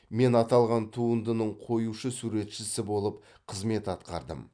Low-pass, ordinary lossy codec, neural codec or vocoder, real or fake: 9.9 kHz; MP3, 96 kbps; none; real